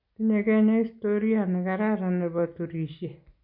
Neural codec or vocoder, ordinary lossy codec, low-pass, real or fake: none; MP3, 32 kbps; 5.4 kHz; real